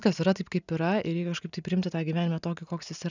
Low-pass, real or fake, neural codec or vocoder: 7.2 kHz; real; none